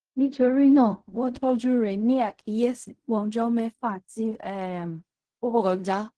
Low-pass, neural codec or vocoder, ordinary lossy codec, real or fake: 10.8 kHz; codec, 16 kHz in and 24 kHz out, 0.4 kbps, LongCat-Audio-Codec, fine tuned four codebook decoder; Opus, 16 kbps; fake